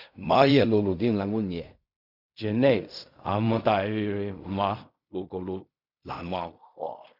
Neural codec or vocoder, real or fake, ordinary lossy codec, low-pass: codec, 16 kHz in and 24 kHz out, 0.4 kbps, LongCat-Audio-Codec, fine tuned four codebook decoder; fake; none; 5.4 kHz